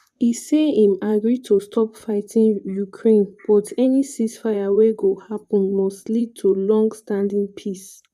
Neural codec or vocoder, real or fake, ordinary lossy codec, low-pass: vocoder, 44.1 kHz, 128 mel bands, Pupu-Vocoder; fake; none; 14.4 kHz